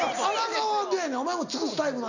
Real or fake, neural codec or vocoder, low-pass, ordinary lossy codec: real; none; 7.2 kHz; none